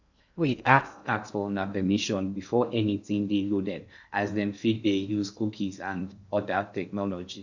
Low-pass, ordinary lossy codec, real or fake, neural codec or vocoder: 7.2 kHz; none; fake; codec, 16 kHz in and 24 kHz out, 0.6 kbps, FocalCodec, streaming, 2048 codes